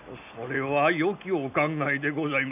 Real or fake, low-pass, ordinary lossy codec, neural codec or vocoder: real; 3.6 kHz; none; none